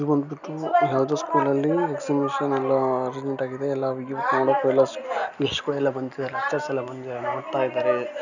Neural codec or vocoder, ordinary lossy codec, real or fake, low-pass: none; none; real; 7.2 kHz